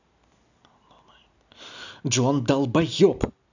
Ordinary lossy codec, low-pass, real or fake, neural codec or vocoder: none; 7.2 kHz; real; none